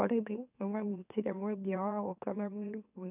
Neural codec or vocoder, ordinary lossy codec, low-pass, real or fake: autoencoder, 44.1 kHz, a latent of 192 numbers a frame, MeloTTS; none; 3.6 kHz; fake